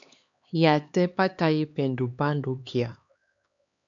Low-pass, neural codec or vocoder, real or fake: 7.2 kHz; codec, 16 kHz, 2 kbps, X-Codec, HuBERT features, trained on LibriSpeech; fake